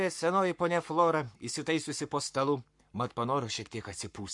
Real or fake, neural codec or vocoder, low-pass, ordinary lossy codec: fake; codec, 44.1 kHz, 7.8 kbps, Pupu-Codec; 10.8 kHz; MP3, 64 kbps